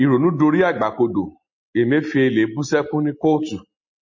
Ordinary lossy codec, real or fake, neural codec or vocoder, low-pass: MP3, 32 kbps; real; none; 7.2 kHz